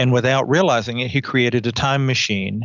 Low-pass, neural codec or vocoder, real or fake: 7.2 kHz; none; real